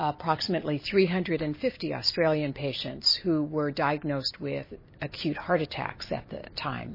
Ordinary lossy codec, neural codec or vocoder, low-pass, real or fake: MP3, 24 kbps; none; 5.4 kHz; real